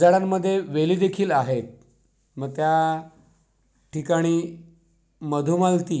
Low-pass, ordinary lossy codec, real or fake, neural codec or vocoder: none; none; real; none